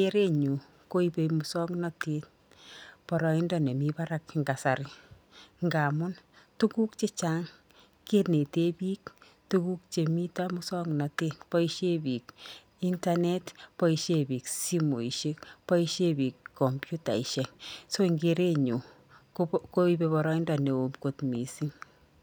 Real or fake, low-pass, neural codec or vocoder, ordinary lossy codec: real; none; none; none